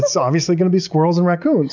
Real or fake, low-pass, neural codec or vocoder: real; 7.2 kHz; none